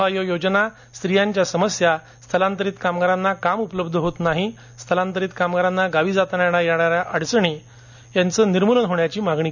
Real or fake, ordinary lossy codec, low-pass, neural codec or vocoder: real; none; 7.2 kHz; none